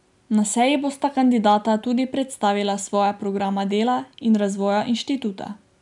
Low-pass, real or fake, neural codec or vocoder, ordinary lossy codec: 10.8 kHz; real; none; none